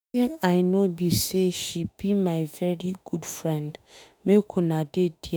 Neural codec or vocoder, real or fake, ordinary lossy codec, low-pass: autoencoder, 48 kHz, 32 numbers a frame, DAC-VAE, trained on Japanese speech; fake; none; none